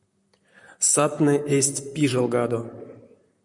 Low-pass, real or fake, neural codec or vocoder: 10.8 kHz; fake; vocoder, 44.1 kHz, 128 mel bands, Pupu-Vocoder